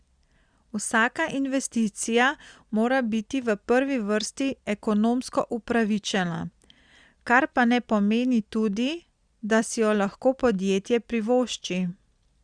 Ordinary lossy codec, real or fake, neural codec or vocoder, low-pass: Opus, 64 kbps; real; none; 9.9 kHz